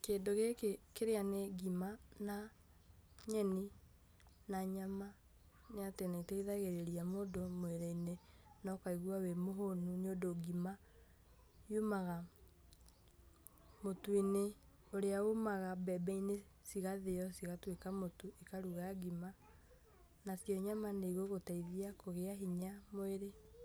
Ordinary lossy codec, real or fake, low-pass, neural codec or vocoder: none; real; none; none